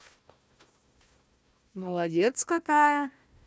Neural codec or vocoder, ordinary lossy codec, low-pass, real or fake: codec, 16 kHz, 1 kbps, FunCodec, trained on Chinese and English, 50 frames a second; none; none; fake